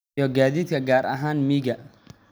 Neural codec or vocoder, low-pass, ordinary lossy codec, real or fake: none; none; none; real